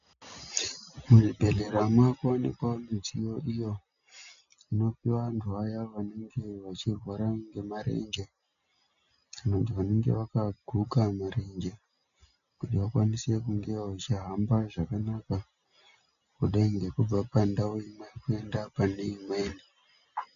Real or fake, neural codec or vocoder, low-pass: real; none; 7.2 kHz